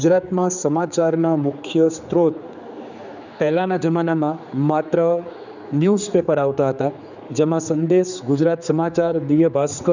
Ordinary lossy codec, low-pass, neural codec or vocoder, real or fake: none; 7.2 kHz; codec, 16 kHz, 4 kbps, X-Codec, HuBERT features, trained on general audio; fake